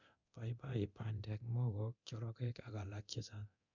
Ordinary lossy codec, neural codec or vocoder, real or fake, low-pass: none; codec, 24 kHz, 0.9 kbps, DualCodec; fake; 7.2 kHz